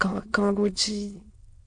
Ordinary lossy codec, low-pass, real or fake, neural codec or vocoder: MP3, 48 kbps; 9.9 kHz; fake; autoencoder, 22.05 kHz, a latent of 192 numbers a frame, VITS, trained on many speakers